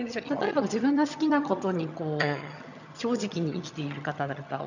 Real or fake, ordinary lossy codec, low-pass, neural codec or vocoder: fake; none; 7.2 kHz; vocoder, 22.05 kHz, 80 mel bands, HiFi-GAN